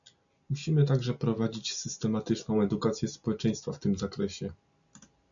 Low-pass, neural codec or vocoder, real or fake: 7.2 kHz; none; real